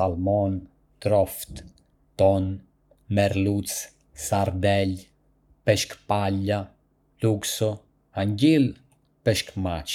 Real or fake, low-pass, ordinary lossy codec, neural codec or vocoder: fake; 19.8 kHz; none; codec, 44.1 kHz, 7.8 kbps, Pupu-Codec